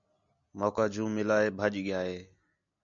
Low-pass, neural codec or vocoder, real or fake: 7.2 kHz; none; real